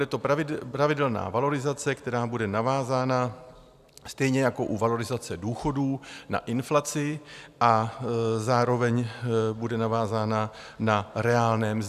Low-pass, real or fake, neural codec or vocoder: 14.4 kHz; real; none